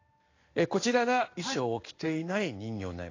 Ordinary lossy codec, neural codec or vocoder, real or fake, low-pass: AAC, 32 kbps; codec, 16 kHz in and 24 kHz out, 1 kbps, XY-Tokenizer; fake; 7.2 kHz